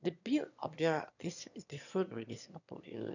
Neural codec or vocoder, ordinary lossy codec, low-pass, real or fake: autoencoder, 22.05 kHz, a latent of 192 numbers a frame, VITS, trained on one speaker; none; 7.2 kHz; fake